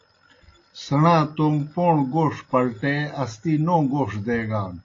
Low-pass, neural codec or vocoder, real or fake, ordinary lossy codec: 7.2 kHz; none; real; AAC, 32 kbps